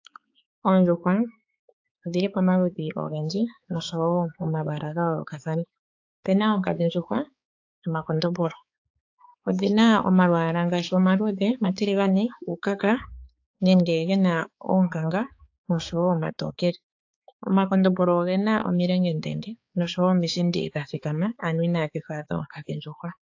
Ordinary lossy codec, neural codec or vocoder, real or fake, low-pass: AAC, 48 kbps; codec, 16 kHz, 4 kbps, X-Codec, HuBERT features, trained on balanced general audio; fake; 7.2 kHz